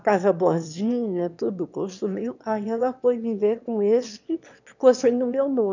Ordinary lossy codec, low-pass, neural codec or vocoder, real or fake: none; 7.2 kHz; autoencoder, 22.05 kHz, a latent of 192 numbers a frame, VITS, trained on one speaker; fake